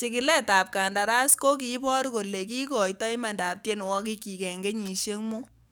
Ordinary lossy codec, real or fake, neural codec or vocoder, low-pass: none; fake; codec, 44.1 kHz, 7.8 kbps, DAC; none